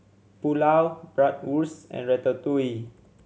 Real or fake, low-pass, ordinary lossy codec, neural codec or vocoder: real; none; none; none